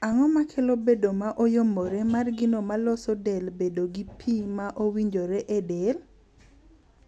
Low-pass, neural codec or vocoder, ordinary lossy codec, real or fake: none; none; none; real